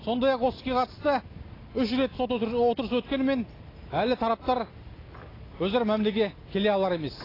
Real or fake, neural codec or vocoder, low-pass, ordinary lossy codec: real; none; 5.4 kHz; AAC, 24 kbps